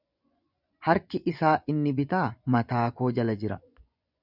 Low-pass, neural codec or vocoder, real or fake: 5.4 kHz; vocoder, 24 kHz, 100 mel bands, Vocos; fake